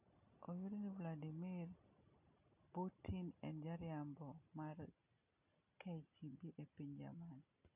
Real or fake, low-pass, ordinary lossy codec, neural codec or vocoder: real; 3.6 kHz; none; none